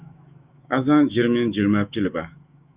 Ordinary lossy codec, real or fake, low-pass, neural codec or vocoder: Opus, 64 kbps; fake; 3.6 kHz; autoencoder, 48 kHz, 128 numbers a frame, DAC-VAE, trained on Japanese speech